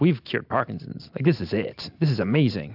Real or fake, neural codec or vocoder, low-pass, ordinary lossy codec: real; none; 5.4 kHz; AAC, 48 kbps